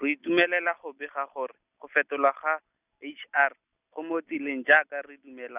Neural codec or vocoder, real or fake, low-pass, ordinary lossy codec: none; real; 3.6 kHz; none